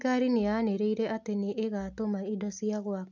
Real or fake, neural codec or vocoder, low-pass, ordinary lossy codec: real; none; 7.2 kHz; none